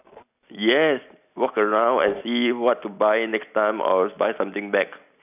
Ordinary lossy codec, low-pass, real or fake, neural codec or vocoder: none; 3.6 kHz; real; none